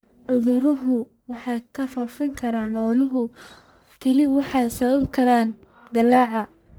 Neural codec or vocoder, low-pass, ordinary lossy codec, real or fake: codec, 44.1 kHz, 1.7 kbps, Pupu-Codec; none; none; fake